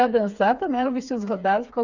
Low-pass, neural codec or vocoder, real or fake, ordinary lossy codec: 7.2 kHz; codec, 16 kHz, 4 kbps, FreqCodec, smaller model; fake; none